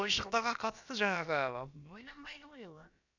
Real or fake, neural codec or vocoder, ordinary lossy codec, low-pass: fake; codec, 16 kHz, about 1 kbps, DyCAST, with the encoder's durations; none; 7.2 kHz